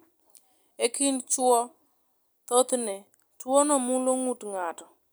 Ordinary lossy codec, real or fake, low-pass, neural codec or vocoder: none; real; none; none